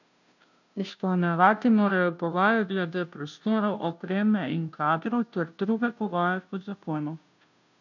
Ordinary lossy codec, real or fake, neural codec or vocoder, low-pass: none; fake; codec, 16 kHz, 0.5 kbps, FunCodec, trained on Chinese and English, 25 frames a second; 7.2 kHz